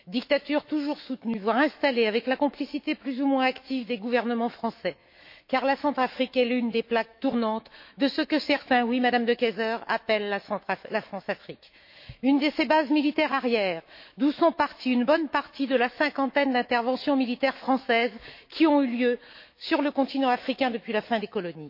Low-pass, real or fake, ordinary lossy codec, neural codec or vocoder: 5.4 kHz; fake; MP3, 32 kbps; autoencoder, 48 kHz, 128 numbers a frame, DAC-VAE, trained on Japanese speech